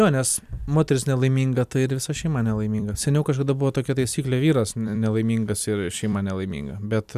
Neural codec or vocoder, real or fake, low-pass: vocoder, 44.1 kHz, 128 mel bands every 256 samples, BigVGAN v2; fake; 14.4 kHz